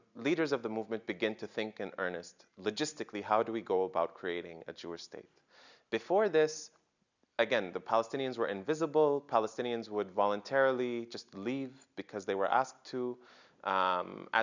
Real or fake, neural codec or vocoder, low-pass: real; none; 7.2 kHz